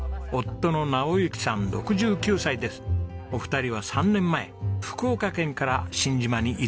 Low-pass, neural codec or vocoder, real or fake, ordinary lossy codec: none; none; real; none